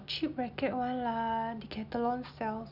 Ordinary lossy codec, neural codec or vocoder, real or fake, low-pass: none; none; real; 5.4 kHz